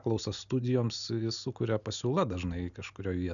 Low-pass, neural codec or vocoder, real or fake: 7.2 kHz; none; real